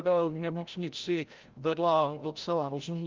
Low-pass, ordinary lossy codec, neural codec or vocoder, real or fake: 7.2 kHz; Opus, 32 kbps; codec, 16 kHz, 0.5 kbps, FreqCodec, larger model; fake